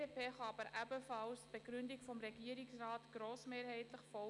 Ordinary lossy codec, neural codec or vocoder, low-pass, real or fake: none; none; 10.8 kHz; real